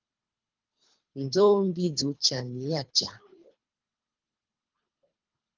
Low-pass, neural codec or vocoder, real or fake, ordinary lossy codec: 7.2 kHz; codec, 24 kHz, 3 kbps, HILCodec; fake; Opus, 32 kbps